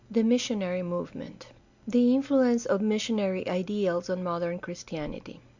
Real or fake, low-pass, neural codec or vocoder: real; 7.2 kHz; none